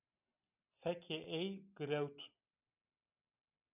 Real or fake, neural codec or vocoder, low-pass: real; none; 3.6 kHz